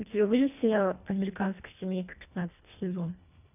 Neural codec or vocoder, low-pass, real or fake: codec, 24 kHz, 1.5 kbps, HILCodec; 3.6 kHz; fake